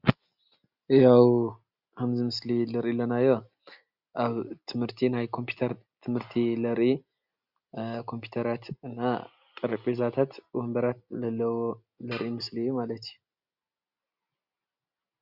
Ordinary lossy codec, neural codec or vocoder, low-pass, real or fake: Opus, 64 kbps; none; 5.4 kHz; real